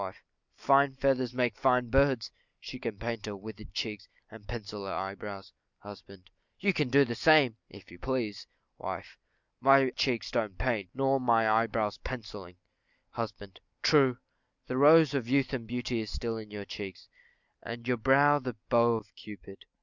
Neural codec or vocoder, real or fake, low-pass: none; real; 7.2 kHz